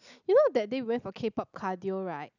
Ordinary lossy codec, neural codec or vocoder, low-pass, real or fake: none; none; 7.2 kHz; real